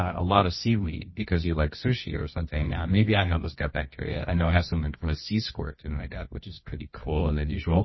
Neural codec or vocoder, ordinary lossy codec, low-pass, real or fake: codec, 24 kHz, 0.9 kbps, WavTokenizer, medium music audio release; MP3, 24 kbps; 7.2 kHz; fake